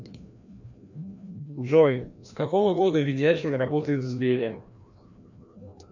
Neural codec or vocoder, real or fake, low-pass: codec, 16 kHz, 1 kbps, FreqCodec, larger model; fake; 7.2 kHz